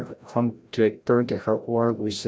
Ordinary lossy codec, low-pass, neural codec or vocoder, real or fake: none; none; codec, 16 kHz, 0.5 kbps, FreqCodec, larger model; fake